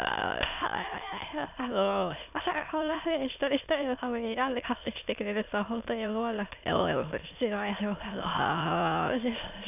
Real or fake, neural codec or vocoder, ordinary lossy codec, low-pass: fake; autoencoder, 22.05 kHz, a latent of 192 numbers a frame, VITS, trained on many speakers; none; 3.6 kHz